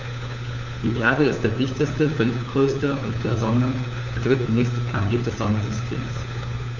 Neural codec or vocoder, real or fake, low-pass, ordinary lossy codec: codec, 16 kHz, 4 kbps, FunCodec, trained on LibriTTS, 50 frames a second; fake; 7.2 kHz; none